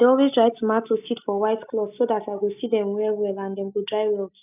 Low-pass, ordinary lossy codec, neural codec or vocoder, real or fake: 3.6 kHz; none; none; real